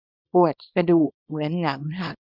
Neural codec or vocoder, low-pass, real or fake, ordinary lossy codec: codec, 24 kHz, 0.9 kbps, WavTokenizer, small release; 5.4 kHz; fake; none